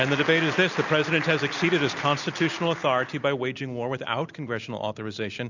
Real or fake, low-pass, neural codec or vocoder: real; 7.2 kHz; none